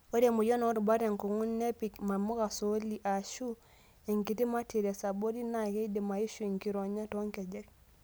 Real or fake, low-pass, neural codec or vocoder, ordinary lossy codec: real; none; none; none